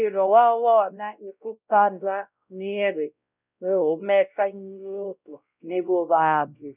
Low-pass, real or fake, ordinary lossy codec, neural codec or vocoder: 3.6 kHz; fake; none; codec, 16 kHz, 0.5 kbps, X-Codec, WavLM features, trained on Multilingual LibriSpeech